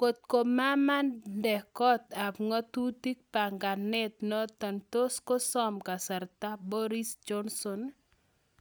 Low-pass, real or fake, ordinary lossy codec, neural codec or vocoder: none; real; none; none